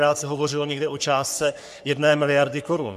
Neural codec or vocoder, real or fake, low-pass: codec, 44.1 kHz, 3.4 kbps, Pupu-Codec; fake; 14.4 kHz